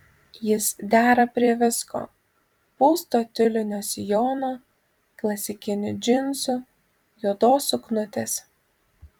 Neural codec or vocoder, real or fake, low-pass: vocoder, 44.1 kHz, 128 mel bands every 256 samples, BigVGAN v2; fake; 19.8 kHz